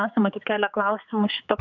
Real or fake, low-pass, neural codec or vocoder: fake; 7.2 kHz; codec, 16 kHz, 4 kbps, X-Codec, HuBERT features, trained on general audio